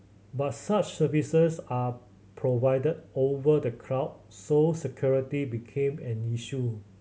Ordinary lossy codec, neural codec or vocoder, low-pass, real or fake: none; none; none; real